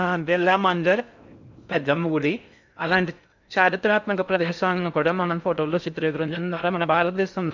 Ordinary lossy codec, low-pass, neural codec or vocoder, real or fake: none; 7.2 kHz; codec, 16 kHz in and 24 kHz out, 0.6 kbps, FocalCodec, streaming, 4096 codes; fake